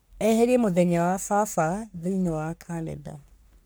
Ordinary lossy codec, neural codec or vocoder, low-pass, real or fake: none; codec, 44.1 kHz, 3.4 kbps, Pupu-Codec; none; fake